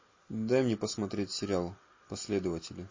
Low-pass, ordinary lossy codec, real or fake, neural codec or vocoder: 7.2 kHz; MP3, 32 kbps; real; none